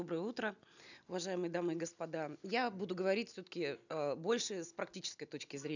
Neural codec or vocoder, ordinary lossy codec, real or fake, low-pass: none; none; real; 7.2 kHz